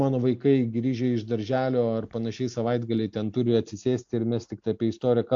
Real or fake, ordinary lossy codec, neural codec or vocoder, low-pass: real; AAC, 64 kbps; none; 7.2 kHz